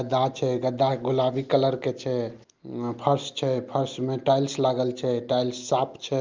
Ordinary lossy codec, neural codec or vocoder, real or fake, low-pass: Opus, 24 kbps; none; real; 7.2 kHz